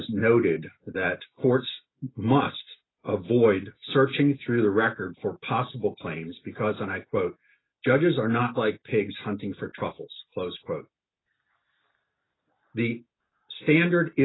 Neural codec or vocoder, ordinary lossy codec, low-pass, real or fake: none; AAC, 16 kbps; 7.2 kHz; real